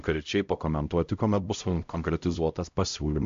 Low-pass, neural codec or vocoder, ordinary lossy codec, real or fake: 7.2 kHz; codec, 16 kHz, 0.5 kbps, X-Codec, HuBERT features, trained on LibriSpeech; MP3, 48 kbps; fake